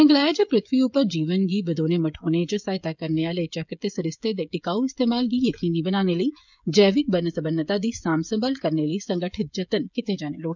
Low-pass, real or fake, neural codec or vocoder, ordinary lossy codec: 7.2 kHz; fake; codec, 16 kHz, 16 kbps, FreqCodec, smaller model; none